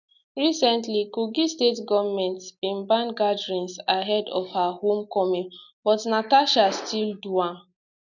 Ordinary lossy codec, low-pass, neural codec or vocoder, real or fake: none; 7.2 kHz; none; real